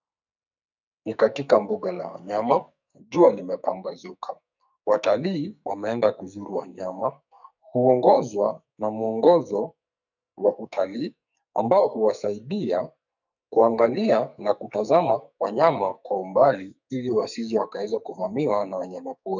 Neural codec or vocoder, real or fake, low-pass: codec, 44.1 kHz, 2.6 kbps, SNAC; fake; 7.2 kHz